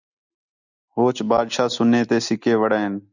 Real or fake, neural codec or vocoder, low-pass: real; none; 7.2 kHz